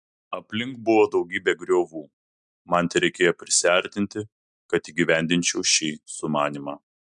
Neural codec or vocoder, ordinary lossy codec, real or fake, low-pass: none; MP3, 96 kbps; real; 10.8 kHz